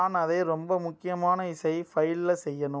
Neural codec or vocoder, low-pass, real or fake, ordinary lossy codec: none; none; real; none